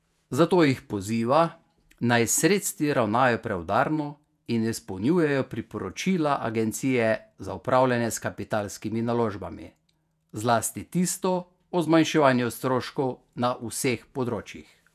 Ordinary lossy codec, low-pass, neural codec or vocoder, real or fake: none; 14.4 kHz; vocoder, 48 kHz, 128 mel bands, Vocos; fake